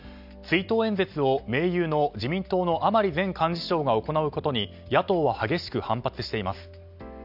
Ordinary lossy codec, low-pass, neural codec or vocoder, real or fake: none; 5.4 kHz; none; real